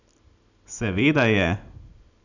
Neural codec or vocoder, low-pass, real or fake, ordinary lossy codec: none; 7.2 kHz; real; none